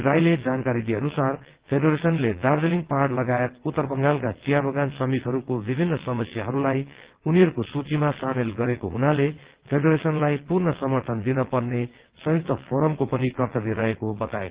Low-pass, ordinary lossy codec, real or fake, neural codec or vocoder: 3.6 kHz; Opus, 16 kbps; fake; vocoder, 22.05 kHz, 80 mel bands, WaveNeXt